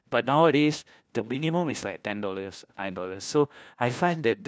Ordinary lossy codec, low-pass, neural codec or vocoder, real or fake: none; none; codec, 16 kHz, 1 kbps, FunCodec, trained on LibriTTS, 50 frames a second; fake